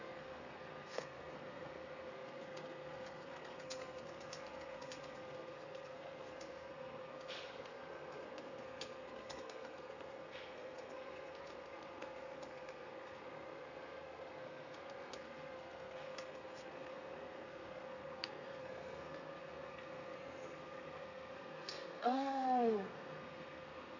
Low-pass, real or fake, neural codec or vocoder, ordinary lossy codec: 7.2 kHz; fake; codec, 32 kHz, 1.9 kbps, SNAC; none